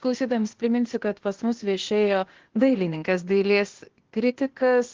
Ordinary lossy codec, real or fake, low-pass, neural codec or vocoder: Opus, 16 kbps; fake; 7.2 kHz; codec, 16 kHz, 0.8 kbps, ZipCodec